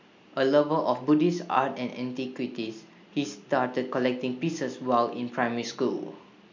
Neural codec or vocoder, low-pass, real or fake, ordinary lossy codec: none; 7.2 kHz; real; MP3, 64 kbps